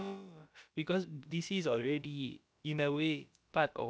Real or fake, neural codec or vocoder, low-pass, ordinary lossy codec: fake; codec, 16 kHz, about 1 kbps, DyCAST, with the encoder's durations; none; none